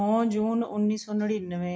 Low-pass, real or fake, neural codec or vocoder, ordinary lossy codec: none; real; none; none